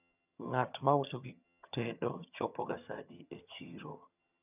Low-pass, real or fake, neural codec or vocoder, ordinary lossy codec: 3.6 kHz; fake; vocoder, 22.05 kHz, 80 mel bands, HiFi-GAN; none